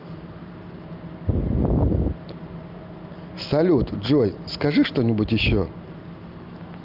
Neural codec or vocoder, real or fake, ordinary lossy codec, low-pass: none; real; Opus, 32 kbps; 5.4 kHz